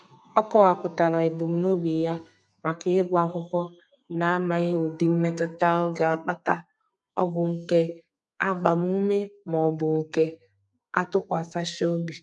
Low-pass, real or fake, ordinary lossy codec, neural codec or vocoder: 10.8 kHz; fake; none; codec, 32 kHz, 1.9 kbps, SNAC